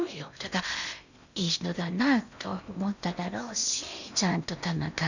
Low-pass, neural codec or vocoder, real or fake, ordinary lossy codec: 7.2 kHz; codec, 16 kHz in and 24 kHz out, 0.8 kbps, FocalCodec, streaming, 65536 codes; fake; none